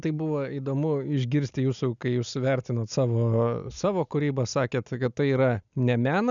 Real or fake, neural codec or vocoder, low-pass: real; none; 7.2 kHz